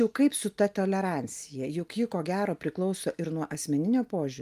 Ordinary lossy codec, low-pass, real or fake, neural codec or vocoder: Opus, 32 kbps; 14.4 kHz; real; none